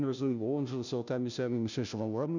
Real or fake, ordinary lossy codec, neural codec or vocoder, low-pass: fake; none; codec, 16 kHz, 0.5 kbps, FunCodec, trained on Chinese and English, 25 frames a second; 7.2 kHz